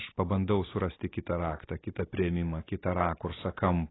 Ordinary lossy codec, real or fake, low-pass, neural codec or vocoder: AAC, 16 kbps; real; 7.2 kHz; none